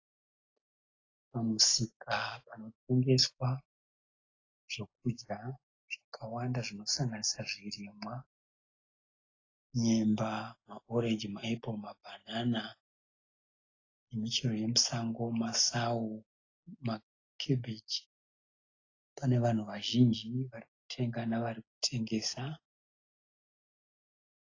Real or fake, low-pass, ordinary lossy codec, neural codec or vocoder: real; 7.2 kHz; AAC, 32 kbps; none